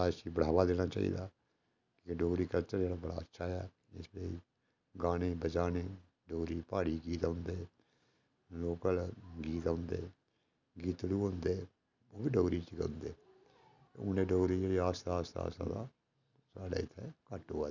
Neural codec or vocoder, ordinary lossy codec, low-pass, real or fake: none; none; 7.2 kHz; real